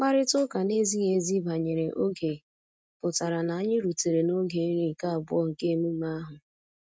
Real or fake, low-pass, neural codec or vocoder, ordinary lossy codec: real; none; none; none